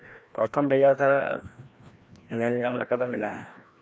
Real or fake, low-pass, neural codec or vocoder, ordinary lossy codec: fake; none; codec, 16 kHz, 1 kbps, FreqCodec, larger model; none